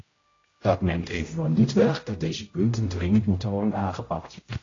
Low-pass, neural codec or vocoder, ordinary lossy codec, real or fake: 7.2 kHz; codec, 16 kHz, 0.5 kbps, X-Codec, HuBERT features, trained on general audio; AAC, 32 kbps; fake